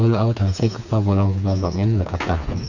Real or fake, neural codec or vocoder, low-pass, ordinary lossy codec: fake; codec, 16 kHz, 4 kbps, FreqCodec, smaller model; 7.2 kHz; none